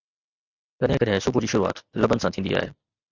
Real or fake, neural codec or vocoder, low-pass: real; none; 7.2 kHz